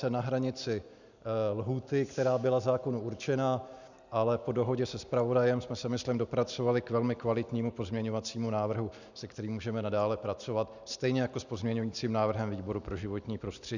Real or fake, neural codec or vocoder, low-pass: real; none; 7.2 kHz